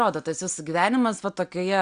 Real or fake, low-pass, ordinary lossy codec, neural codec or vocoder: real; 9.9 kHz; Opus, 64 kbps; none